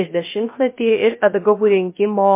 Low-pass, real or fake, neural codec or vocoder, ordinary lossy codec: 3.6 kHz; fake; codec, 16 kHz, 0.3 kbps, FocalCodec; MP3, 24 kbps